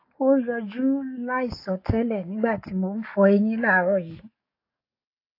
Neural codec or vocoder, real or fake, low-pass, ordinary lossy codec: codec, 44.1 kHz, 7.8 kbps, DAC; fake; 5.4 kHz; AAC, 32 kbps